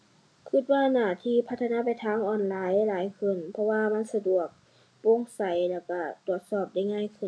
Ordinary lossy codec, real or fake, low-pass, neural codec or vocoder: none; real; none; none